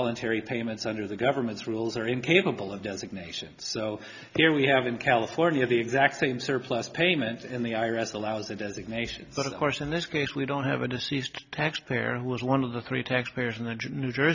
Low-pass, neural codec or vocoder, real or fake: 7.2 kHz; none; real